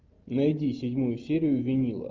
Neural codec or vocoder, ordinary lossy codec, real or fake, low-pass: none; Opus, 24 kbps; real; 7.2 kHz